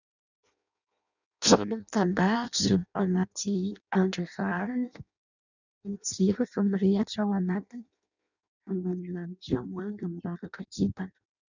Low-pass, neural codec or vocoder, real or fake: 7.2 kHz; codec, 16 kHz in and 24 kHz out, 0.6 kbps, FireRedTTS-2 codec; fake